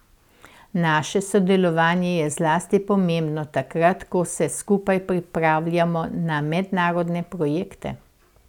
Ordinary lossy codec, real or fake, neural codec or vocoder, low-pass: none; real; none; 19.8 kHz